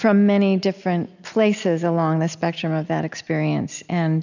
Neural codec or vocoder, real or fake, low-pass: none; real; 7.2 kHz